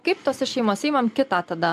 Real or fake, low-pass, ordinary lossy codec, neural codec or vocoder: real; 14.4 kHz; MP3, 64 kbps; none